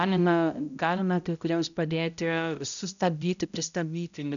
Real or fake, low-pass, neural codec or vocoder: fake; 7.2 kHz; codec, 16 kHz, 0.5 kbps, X-Codec, HuBERT features, trained on balanced general audio